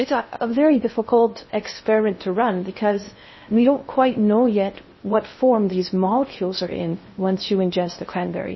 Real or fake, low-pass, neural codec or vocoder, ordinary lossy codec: fake; 7.2 kHz; codec, 16 kHz in and 24 kHz out, 0.6 kbps, FocalCodec, streaming, 2048 codes; MP3, 24 kbps